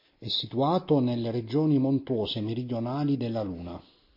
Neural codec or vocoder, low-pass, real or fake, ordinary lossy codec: none; 5.4 kHz; real; MP3, 32 kbps